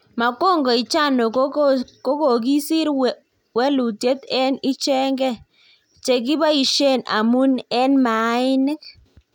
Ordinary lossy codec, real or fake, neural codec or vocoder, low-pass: none; real; none; 19.8 kHz